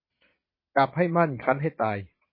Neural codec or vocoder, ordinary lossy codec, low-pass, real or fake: vocoder, 22.05 kHz, 80 mel bands, Vocos; MP3, 24 kbps; 5.4 kHz; fake